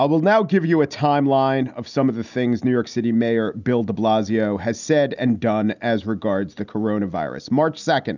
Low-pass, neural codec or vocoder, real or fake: 7.2 kHz; none; real